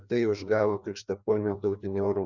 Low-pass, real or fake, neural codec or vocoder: 7.2 kHz; fake; codec, 16 kHz, 2 kbps, FreqCodec, larger model